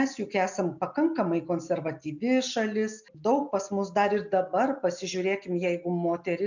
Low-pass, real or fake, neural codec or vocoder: 7.2 kHz; real; none